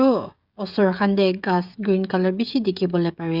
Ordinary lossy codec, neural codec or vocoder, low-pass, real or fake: Opus, 64 kbps; codec, 16 kHz, 16 kbps, FreqCodec, smaller model; 5.4 kHz; fake